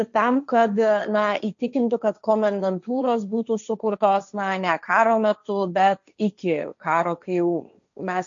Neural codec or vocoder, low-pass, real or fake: codec, 16 kHz, 1.1 kbps, Voila-Tokenizer; 7.2 kHz; fake